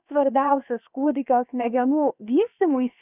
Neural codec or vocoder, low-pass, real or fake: codec, 16 kHz, about 1 kbps, DyCAST, with the encoder's durations; 3.6 kHz; fake